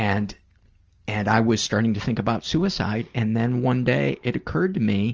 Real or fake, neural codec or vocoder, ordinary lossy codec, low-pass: real; none; Opus, 24 kbps; 7.2 kHz